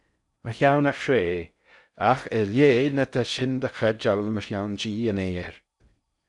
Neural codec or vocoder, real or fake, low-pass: codec, 16 kHz in and 24 kHz out, 0.6 kbps, FocalCodec, streaming, 4096 codes; fake; 10.8 kHz